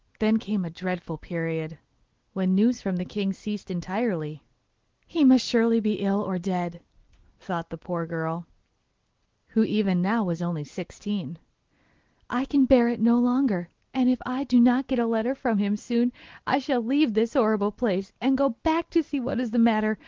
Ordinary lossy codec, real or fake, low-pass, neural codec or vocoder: Opus, 16 kbps; real; 7.2 kHz; none